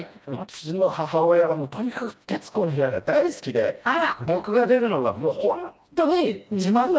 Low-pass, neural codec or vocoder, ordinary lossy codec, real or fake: none; codec, 16 kHz, 1 kbps, FreqCodec, smaller model; none; fake